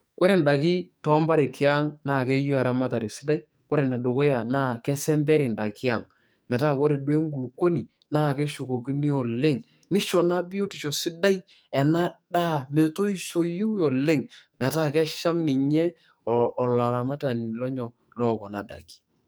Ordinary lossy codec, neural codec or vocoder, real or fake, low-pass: none; codec, 44.1 kHz, 2.6 kbps, SNAC; fake; none